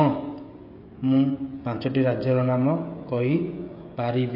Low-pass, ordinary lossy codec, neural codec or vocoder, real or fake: 5.4 kHz; MP3, 32 kbps; codec, 16 kHz, 16 kbps, FreqCodec, smaller model; fake